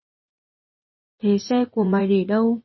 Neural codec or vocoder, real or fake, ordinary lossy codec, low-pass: vocoder, 24 kHz, 100 mel bands, Vocos; fake; MP3, 24 kbps; 7.2 kHz